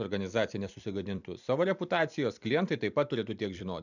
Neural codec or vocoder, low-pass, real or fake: vocoder, 44.1 kHz, 128 mel bands every 256 samples, BigVGAN v2; 7.2 kHz; fake